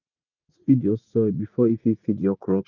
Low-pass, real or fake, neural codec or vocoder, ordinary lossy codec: 7.2 kHz; fake; vocoder, 44.1 kHz, 80 mel bands, Vocos; none